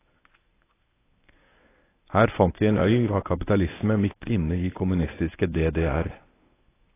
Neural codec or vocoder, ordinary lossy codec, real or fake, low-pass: codec, 24 kHz, 0.9 kbps, WavTokenizer, medium speech release version 1; AAC, 16 kbps; fake; 3.6 kHz